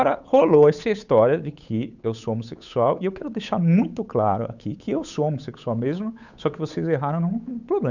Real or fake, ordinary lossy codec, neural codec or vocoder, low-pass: fake; none; codec, 16 kHz, 8 kbps, FunCodec, trained on Chinese and English, 25 frames a second; 7.2 kHz